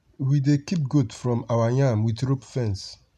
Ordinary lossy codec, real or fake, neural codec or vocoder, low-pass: none; real; none; 14.4 kHz